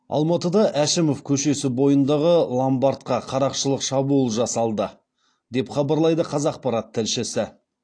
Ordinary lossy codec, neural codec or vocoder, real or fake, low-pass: AAC, 48 kbps; none; real; 9.9 kHz